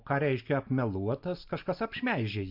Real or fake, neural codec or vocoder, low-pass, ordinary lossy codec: real; none; 5.4 kHz; MP3, 48 kbps